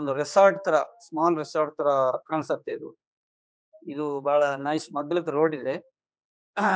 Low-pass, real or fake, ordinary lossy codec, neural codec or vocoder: none; fake; none; codec, 16 kHz, 4 kbps, X-Codec, HuBERT features, trained on general audio